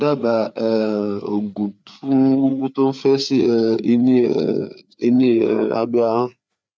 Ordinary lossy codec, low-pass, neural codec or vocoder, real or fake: none; none; codec, 16 kHz, 4 kbps, FreqCodec, larger model; fake